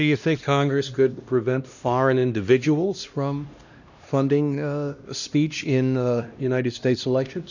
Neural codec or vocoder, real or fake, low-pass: codec, 16 kHz, 1 kbps, X-Codec, HuBERT features, trained on LibriSpeech; fake; 7.2 kHz